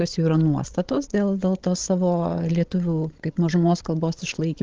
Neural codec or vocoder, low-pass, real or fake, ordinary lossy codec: codec, 16 kHz, 16 kbps, FreqCodec, larger model; 7.2 kHz; fake; Opus, 16 kbps